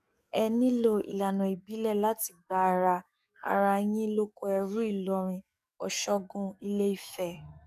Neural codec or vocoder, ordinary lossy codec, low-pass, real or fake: codec, 44.1 kHz, 7.8 kbps, DAC; none; 14.4 kHz; fake